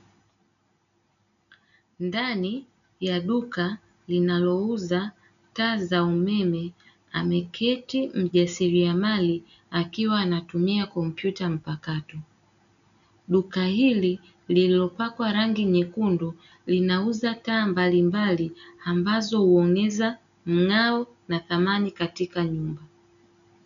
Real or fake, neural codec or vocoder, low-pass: real; none; 7.2 kHz